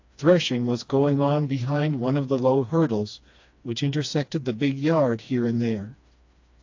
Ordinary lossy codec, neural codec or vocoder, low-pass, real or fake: AAC, 48 kbps; codec, 16 kHz, 2 kbps, FreqCodec, smaller model; 7.2 kHz; fake